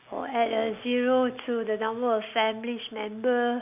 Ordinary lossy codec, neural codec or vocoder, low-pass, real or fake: none; none; 3.6 kHz; real